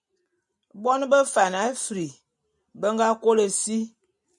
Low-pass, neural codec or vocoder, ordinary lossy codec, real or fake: 10.8 kHz; none; MP3, 96 kbps; real